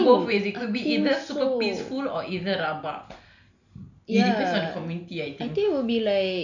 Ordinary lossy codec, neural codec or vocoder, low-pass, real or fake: none; none; 7.2 kHz; real